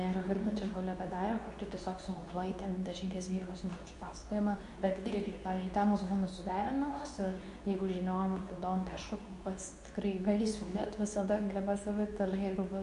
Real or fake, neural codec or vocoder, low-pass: fake; codec, 24 kHz, 0.9 kbps, WavTokenizer, medium speech release version 2; 10.8 kHz